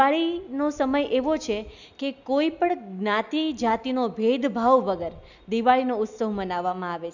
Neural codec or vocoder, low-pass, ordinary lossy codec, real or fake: none; 7.2 kHz; none; real